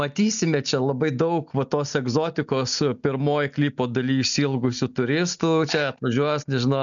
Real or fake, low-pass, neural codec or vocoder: real; 7.2 kHz; none